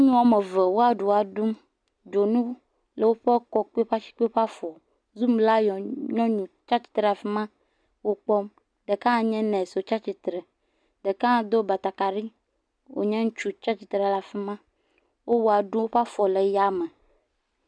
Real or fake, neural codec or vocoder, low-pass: real; none; 9.9 kHz